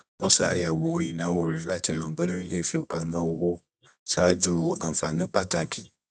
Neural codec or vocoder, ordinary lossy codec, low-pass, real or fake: codec, 24 kHz, 0.9 kbps, WavTokenizer, medium music audio release; none; 10.8 kHz; fake